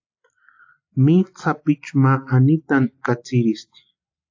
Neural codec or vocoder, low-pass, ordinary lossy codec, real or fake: none; 7.2 kHz; AAC, 48 kbps; real